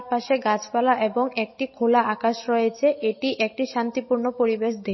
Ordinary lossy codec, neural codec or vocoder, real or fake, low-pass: MP3, 24 kbps; none; real; 7.2 kHz